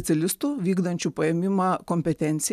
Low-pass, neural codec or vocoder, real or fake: 14.4 kHz; none; real